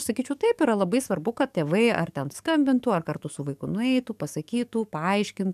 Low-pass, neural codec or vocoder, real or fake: 14.4 kHz; codec, 44.1 kHz, 7.8 kbps, DAC; fake